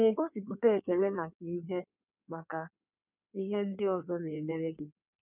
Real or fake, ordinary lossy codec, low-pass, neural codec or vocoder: fake; none; 3.6 kHz; codec, 16 kHz in and 24 kHz out, 1.1 kbps, FireRedTTS-2 codec